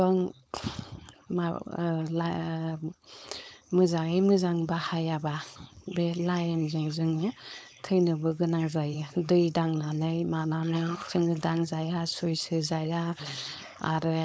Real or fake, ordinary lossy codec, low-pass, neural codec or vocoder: fake; none; none; codec, 16 kHz, 4.8 kbps, FACodec